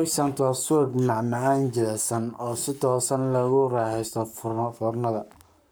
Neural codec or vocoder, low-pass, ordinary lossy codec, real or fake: codec, 44.1 kHz, 7.8 kbps, Pupu-Codec; none; none; fake